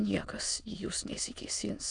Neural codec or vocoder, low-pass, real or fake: autoencoder, 22.05 kHz, a latent of 192 numbers a frame, VITS, trained on many speakers; 9.9 kHz; fake